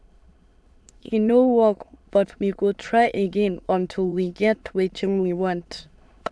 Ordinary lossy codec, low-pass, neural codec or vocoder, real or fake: none; none; autoencoder, 22.05 kHz, a latent of 192 numbers a frame, VITS, trained on many speakers; fake